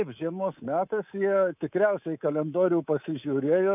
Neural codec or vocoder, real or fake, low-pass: codec, 24 kHz, 3.1 kbps, DualCodec; fake; 3.6 kHz